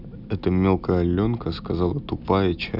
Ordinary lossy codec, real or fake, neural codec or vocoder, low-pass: none; real; none; 5.4 kHz